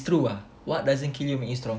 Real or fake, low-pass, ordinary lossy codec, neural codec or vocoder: real; none; none; none